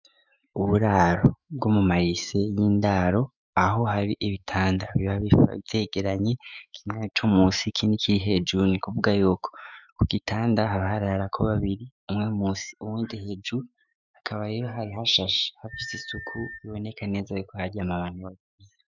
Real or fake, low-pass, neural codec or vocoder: fake; 7.2 kHz; autoencoder, 48 kHz, 128 numbers a frame, DAC-VAE, trained on Japanese speech